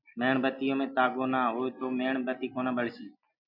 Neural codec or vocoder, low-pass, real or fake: none; 5.4 kHz; real